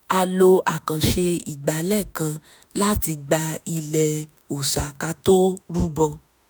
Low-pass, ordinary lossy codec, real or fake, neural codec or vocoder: none; none; fake; autoencoder, 48 kHz, 32 numbers a frame, DAC-VAE, trained on Japanese speech